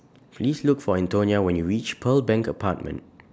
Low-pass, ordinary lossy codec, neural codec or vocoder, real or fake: none; none; none; real